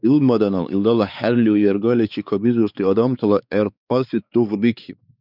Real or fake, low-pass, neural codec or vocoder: fake; 5.4 kHz; codec, 16 kHz, 4 kbps, X-Codec, WavLM features, trained on Multilingual LibriSpeech